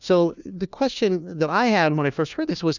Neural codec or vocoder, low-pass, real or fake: codec, 16 kHz, 1 kbps, FunCodec, trained on LibriTTS, 50 frames a second; 7.2 kHz; fake